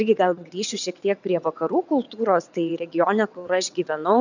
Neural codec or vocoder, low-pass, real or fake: vocoder, 22.05 kHz, 80 mel bands, WaveNeXt; 7.2 kHz; fake